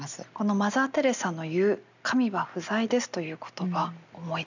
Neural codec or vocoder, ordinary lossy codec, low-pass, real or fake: none; none; 7.2 kHz; real